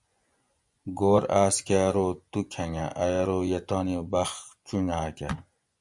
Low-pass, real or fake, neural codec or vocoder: 10.8 kHz; fake; vocoder, 24 kHz, 100 mel bands, Vocos